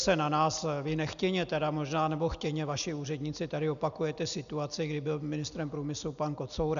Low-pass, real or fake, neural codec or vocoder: 7.2 kHz; real; none